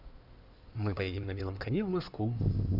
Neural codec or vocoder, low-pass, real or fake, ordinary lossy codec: codec, 16 kHz in and 24 kHz out, 2.2 kbps, FireRedTTS-2 codec; 5.4 kHz; fake; none